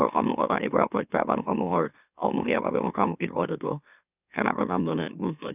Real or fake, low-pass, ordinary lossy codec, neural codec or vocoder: fake; 3.6 kHz; none; autoencoder, 44.1 kHz, a latent of 192 numbers a frame, MeloTTS